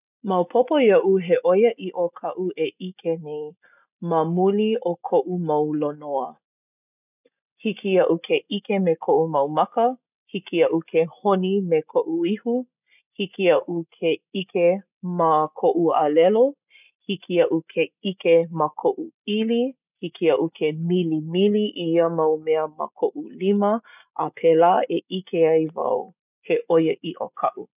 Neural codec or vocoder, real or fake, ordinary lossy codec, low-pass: none; real; none; 3.6 kHz